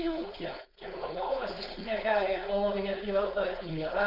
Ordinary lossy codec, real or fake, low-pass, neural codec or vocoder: MP3, 48 kbps; fake; 5.4 kHz; codec, 16 kHz, 4.8 kbps, FACodec